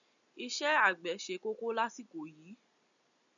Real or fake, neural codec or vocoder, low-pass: real; none; 7.2 kHz